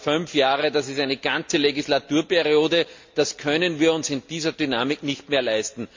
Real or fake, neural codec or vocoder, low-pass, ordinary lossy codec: real; none; 7.2 kHz; MP3, 48 kbps